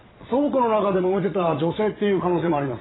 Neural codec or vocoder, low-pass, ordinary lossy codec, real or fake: vocoder, 22.05 kHz, 80 mel bands, Vocos; 7.2 kHz; AAC, 16 kbps; fake